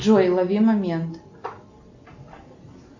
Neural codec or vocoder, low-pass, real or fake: none; 7.2 kHz; real